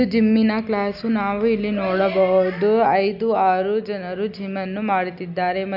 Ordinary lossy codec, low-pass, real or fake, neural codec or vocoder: none; 5.4 kHz; real; none